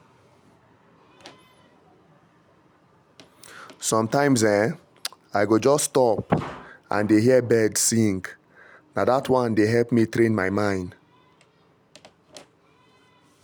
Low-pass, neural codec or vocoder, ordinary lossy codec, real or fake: none; none; none; real